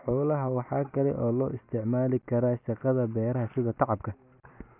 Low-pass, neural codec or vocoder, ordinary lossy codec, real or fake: 3.6 kHz; none; none; real